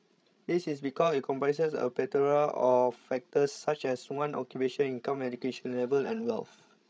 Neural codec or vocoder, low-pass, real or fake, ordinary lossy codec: codec, 16 kHz, 16 kbps, FreqCodec, larger model; none; fake; none